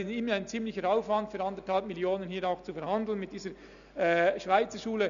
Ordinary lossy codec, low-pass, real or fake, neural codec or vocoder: none; 7.2 kHz; real; none